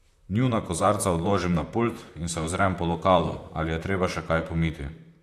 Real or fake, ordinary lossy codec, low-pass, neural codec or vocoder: fake; AAC, 64 kbps; 14.4 kHz; vocoder, 44.1 kHz, 128 mel bands, Pupu-Vocoder